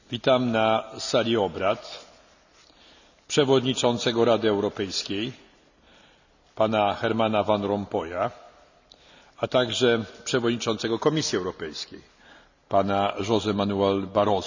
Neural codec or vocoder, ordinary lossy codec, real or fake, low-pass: none; none; real; 7.2 kHz